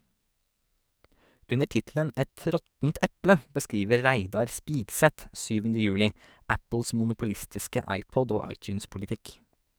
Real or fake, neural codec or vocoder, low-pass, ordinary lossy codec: fake; codec, 44.1 kHz, 2.6 kbps, SNAC; none; none